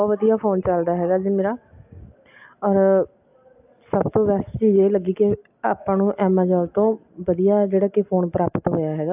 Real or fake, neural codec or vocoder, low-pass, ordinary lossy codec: real; none; 3.6 kHz; AAC, 32 kbps